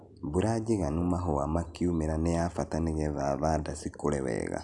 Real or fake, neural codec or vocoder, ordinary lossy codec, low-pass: real; none; none; 10.8 kHz